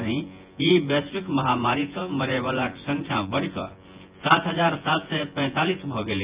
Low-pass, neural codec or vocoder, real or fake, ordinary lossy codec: 3.6 kHz; vocoder, 24 kHz, 100 mel bands, Vocos; fake; Opus, 24 kbps